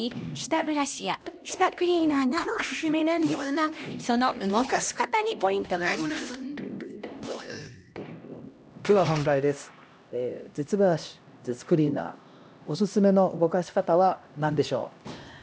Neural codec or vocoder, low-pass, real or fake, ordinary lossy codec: codec, 16 kHz, 1 kbps, X-Codec, HuBERT features, trained on LibriSpeech; none; fake; none